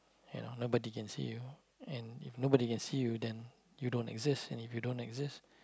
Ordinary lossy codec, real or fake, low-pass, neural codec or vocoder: none; real; none; none